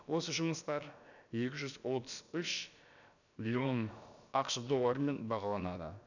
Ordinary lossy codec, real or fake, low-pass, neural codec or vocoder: none; fake; 7.2 kHz; codec, 16 kHz, about 1 kbps, DyCAST, with the encoder's durations